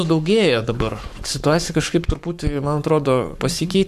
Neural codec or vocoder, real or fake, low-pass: codec, 44.1 kHz, 7.8 kbps, Pupu-Codec; fake; 14.4 kHz